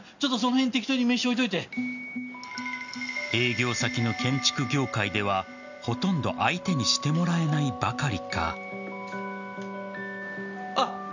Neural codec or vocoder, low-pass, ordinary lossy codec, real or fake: none; 7.2 kHz; none; real